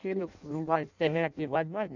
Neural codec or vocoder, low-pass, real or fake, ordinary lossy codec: codec, 16 kHz in and 24 kHz out, 0.6 kbps, FireRedTTS-2 codec; 7.2 kHz; fake; none